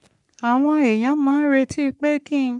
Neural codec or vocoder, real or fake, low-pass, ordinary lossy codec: codec, 44.1 kHz, 3.4 kbps, Pupu-Codec; fake; 10.8 kHz; none